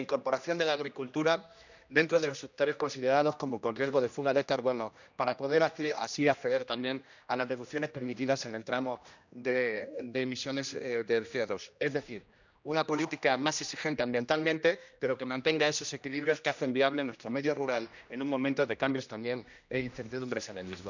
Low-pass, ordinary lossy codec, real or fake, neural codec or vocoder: 7.2 kHz; none; fake; codec, 16 kHz, 1 kbps, X-Codec, HuBERT features, trained on general audio